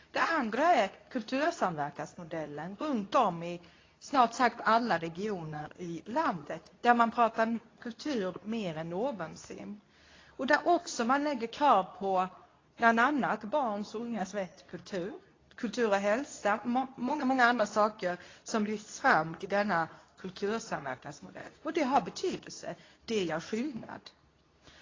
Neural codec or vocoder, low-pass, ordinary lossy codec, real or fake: codec, 24 kHz, 0.9 kbps, WavTokenizer, medium speech release version 2; 7.2 kHz; AAC, 32 kbps; fake